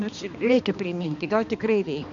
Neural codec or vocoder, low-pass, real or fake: codec, 16 kHz, 2 kbps, X-Codec, HuBERT features, trained on balanced general audio; 7.2 kHz; fake